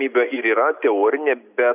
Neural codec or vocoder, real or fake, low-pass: none; real; 3.6 kHz